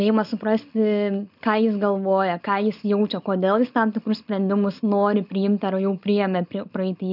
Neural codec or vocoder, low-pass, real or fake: none; 5.4 kHz; real